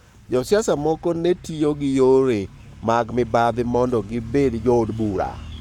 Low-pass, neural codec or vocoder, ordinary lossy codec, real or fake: 19.8 kHz; codec, 44.1 kHz, 7.8 kbps, Pupu-Codec; none; fake